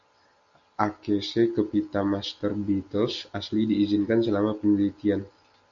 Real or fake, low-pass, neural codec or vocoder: real; 7.2 kHz; none